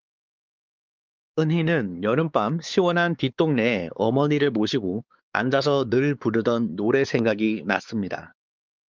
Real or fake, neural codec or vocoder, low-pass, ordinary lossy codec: fake; codec, 16 kHz, 4 kbps, X-Codec, WavLM features, trained on Multilingual LibriSpeech; 7.2 kHz; Opus, 32 kbps